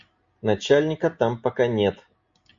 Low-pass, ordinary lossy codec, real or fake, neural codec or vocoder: 7.2 kHz; MP3, 48 kbps; real; none